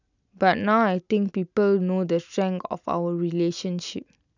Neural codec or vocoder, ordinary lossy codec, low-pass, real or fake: none; none; 7.2 kHz; real